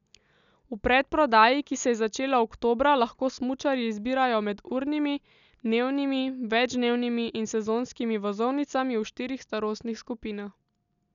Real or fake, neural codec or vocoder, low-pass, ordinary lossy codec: real; none; 7.2 kHz; none